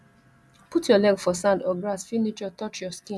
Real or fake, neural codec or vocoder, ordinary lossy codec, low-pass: real; none; none; none